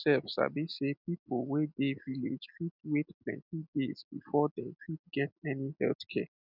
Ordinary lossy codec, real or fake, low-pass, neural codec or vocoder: none; real; 5.4 kHz; none